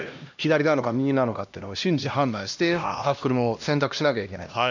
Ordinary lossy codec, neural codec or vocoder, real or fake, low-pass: none; codec, 16 kHz, 1 kbps, X-Codec, HuBERT features, trained on LibriSpeech; fake; 7.2 kHz